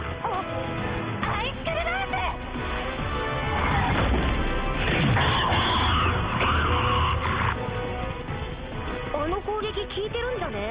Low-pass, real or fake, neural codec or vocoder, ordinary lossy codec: 3.6 kHz; real; none; Opus, 32 kbps